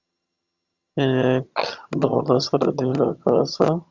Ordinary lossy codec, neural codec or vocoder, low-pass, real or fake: Opus, 64 kbps; vocoder, 22.05 kHz, 80 mel bands, HiFi-GAN; 7.2 kHz; fake